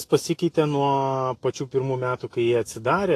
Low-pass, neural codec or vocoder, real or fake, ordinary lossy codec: 14.4 kHz; autoencoder, 48 kHz, 128 numbers a frame, DAC-VAE, trained on Japanese speech; fake; AAC, 48 kbps